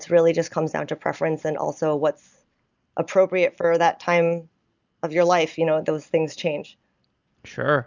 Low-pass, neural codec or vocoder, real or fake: 7.2 kHz; none; real